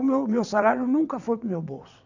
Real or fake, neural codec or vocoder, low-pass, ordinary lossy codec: fake; vocoder, 22.05 kHz, 80 mel bands, WaveNeXt; 7.2 kHz; none